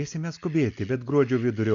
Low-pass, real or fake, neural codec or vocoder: 7.2 kHz; real; none